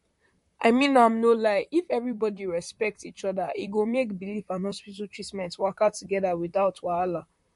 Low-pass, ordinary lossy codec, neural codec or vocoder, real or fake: 14.4 kHz; MP3, 48 kbps; vocoder, 44.1 kHz, 128 mel bands, Pupu-Vocoder; fake